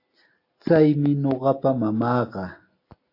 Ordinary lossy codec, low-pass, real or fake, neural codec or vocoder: AAC, 32 kbps; 5.4 kHz; real; none